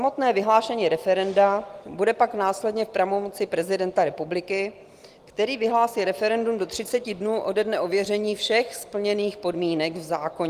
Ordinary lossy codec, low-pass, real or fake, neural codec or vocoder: Opus, 24 kbps; 14.4 kHz; real; none